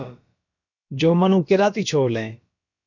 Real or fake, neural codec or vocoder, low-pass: fake; codec, 16 kHz, about 1 kbps, DyCAST, with the encoder's durations; 7.2 kHz